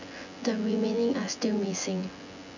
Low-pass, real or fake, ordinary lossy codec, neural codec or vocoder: 7.2 kHz; fake; none; vocoder, 24 kHz, 100 mel bands, Vocos